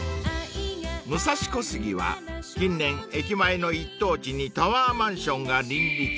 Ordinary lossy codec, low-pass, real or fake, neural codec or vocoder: none; none; real; none